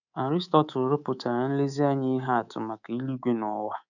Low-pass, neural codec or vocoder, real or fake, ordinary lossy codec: 7.2 kHz; codec, 24 kHz, 3.1 kbps, DualCodec; fake; none